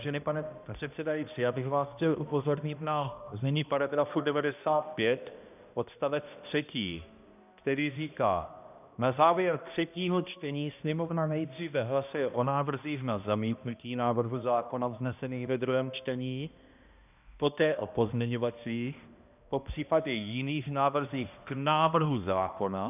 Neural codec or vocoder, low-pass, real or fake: codec, 16 kHz, 1 kbps, X-Codec, HuBERT features, trained on balanced general audio; 3.6 kHz; fake